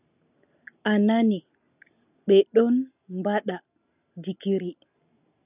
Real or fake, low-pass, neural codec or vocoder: real; 3.6 kHz; none